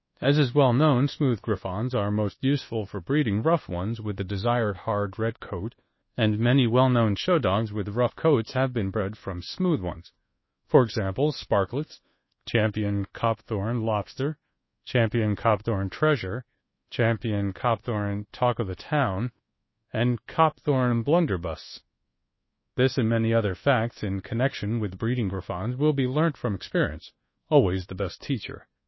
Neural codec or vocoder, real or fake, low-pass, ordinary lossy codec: codec, 24 kHz, 1.2 kbps, DualCodec; fake; 7.2 kHz; MP3, 24 kbps